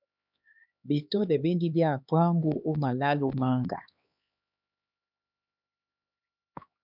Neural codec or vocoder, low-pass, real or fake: codec, 16 kHz, 2 kbps, X-Codec, HuBERT features, trained on LibriSpeech; 5.4 kHz; fake